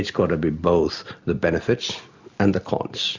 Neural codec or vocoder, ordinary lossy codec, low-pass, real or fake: none; Opus, 64 kbps; 7.2 kHz; real